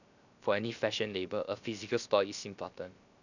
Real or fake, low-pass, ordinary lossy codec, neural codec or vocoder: fake; 7.2 kHz; none; codec, 16 kHz, 0.7 kbps, FocalCodec